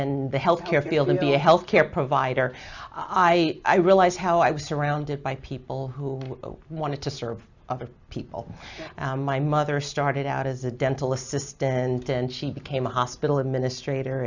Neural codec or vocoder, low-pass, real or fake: none; 7.2 kHz; real